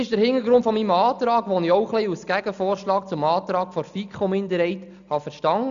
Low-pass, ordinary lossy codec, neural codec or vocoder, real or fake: 7.2 kHz; none; none; real